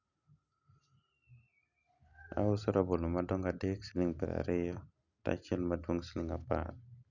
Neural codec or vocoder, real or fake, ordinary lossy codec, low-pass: none; real; none; 7.2 kHz